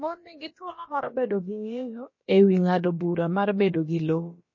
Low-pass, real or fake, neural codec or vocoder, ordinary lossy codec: 7.2 kHz; fake; codec, 16 kHz, about 1 kbps, DyCAST, with the encoder's durations; MP3, 32 kbps